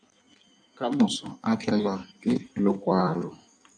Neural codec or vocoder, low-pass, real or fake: codec, 16 kHz in and 24 kHz out, 1.1 kbps, FireRedTTS-2 codec; 9.9 kHz; fake